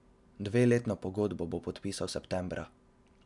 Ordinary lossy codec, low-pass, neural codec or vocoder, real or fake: none; 10.8 kHz; none; real